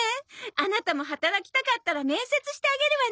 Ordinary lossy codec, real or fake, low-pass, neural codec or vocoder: none; real; none; none